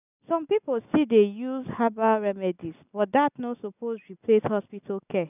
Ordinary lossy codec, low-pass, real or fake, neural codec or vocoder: none; 3.6 kHz; real; none